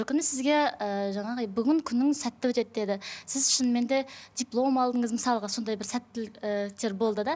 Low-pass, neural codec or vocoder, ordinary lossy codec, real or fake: none; none; none; real